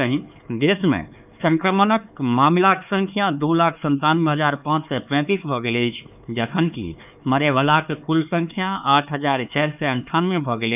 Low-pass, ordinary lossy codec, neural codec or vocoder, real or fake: 3.6 kHz; none; codec, 16 kHz, 4 kbps, X-Codec, HuBERT features, trained on LibriSpeech; fake